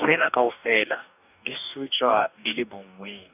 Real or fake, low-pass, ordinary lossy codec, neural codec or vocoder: fake; 3.6 kHz; none; codec, 44.1 kHz, 2.6 kbps, DAC